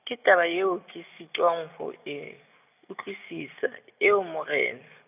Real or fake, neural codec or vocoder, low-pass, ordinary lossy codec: real; none; 3.6 kHz; none